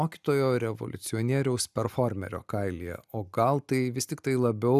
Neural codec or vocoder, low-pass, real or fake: none; 14.4 kHz; real